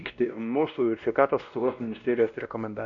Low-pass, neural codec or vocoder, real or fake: 7.2 kHz; codec, 16 kHz, 1 kbps, X-Codec, WavLM features, trained on Multilingual LibriSpeech; fake